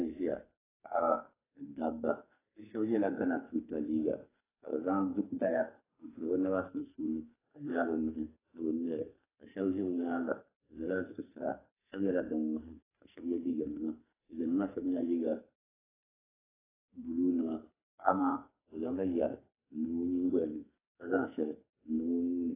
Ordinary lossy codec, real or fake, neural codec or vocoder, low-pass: AAC, 16 kbps; fake; codec, 44.1 kHz, 2.6 kbps, SNAC; 3.6 kHz